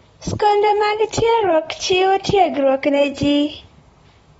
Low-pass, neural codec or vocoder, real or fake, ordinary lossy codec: 19.8 kHz; vocoder, 44.1 kHz, 128 mel bands, Pupu-Vocoder; fake; AAC, 24 kbps